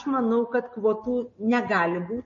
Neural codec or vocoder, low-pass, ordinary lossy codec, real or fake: none; 7.2 kHz; MP3, 32 kbps; real